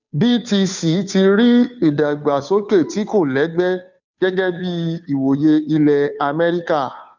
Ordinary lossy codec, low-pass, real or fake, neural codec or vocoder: none; 7.2 kHz; fake; codec, 16 kHz, 2 kbps, FunCodec, trained on Chinese and English, 25 frames a second